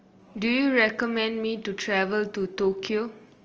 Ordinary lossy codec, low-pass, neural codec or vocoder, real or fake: Opus, 24 kbps; 7.2 kHz; none; real